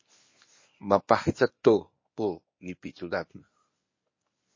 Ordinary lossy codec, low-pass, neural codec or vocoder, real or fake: MP3, 32 kbps; 7.2 kHz; codec, 24 kHz, 0.9 kbps, WavTokenizer, medium speech release version 1; fake